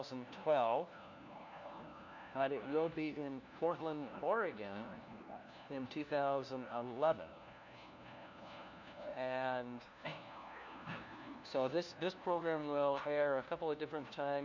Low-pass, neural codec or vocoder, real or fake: 7.2 kHz; codec, 16 kHz, 1 kbps, FunCodec, trained on LibriTTS, 50 frames a second; fake